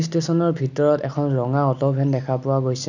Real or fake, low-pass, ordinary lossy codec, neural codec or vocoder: real; 7.2 kHz; none; none